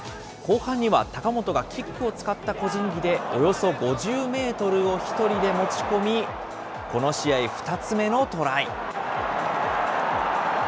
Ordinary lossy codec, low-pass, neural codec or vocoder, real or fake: none; none; none; real